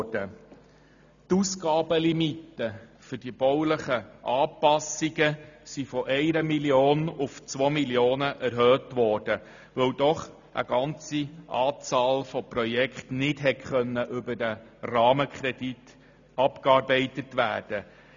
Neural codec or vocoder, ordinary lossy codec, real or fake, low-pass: none; none; real; 7.2 kHz